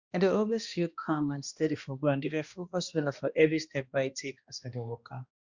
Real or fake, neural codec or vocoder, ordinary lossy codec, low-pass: fake; codec, 16 kHz, 1 kbps, X-Codec, HuBERT features, trained on balanced general audio; Opus, 64 kbps; 7.2 kHz